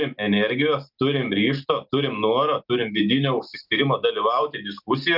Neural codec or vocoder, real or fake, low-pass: none; real; 5.4 kHz